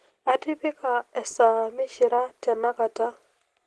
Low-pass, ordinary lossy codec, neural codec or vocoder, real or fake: 10.8 kHz; Opus, 16 kbps; none; real